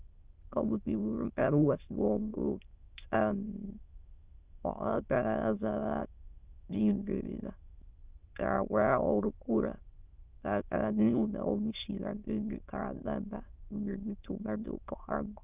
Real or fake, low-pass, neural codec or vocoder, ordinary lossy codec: fake; 3.6 kHz; autoencoder, 22.05 kHz, a latent of 192 numbers a frame, VITS, trained on many speakers; Opus, 24 kbps